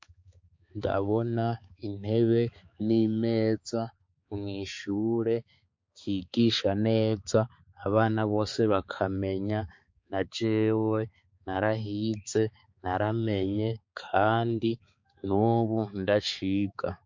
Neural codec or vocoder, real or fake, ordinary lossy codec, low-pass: codec, 16 kHz, 4 kbps, X-Codec, HuBERT features, trained on balanced general audio; fake; MP3, 48 kbps; 7.2 kHz